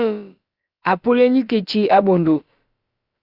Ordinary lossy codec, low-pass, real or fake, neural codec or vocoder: Opus, 64 kbps; 5.4 kHz; fake; codec, 16 kHz, about 1 kbps, DyCAST, with the encoder's durations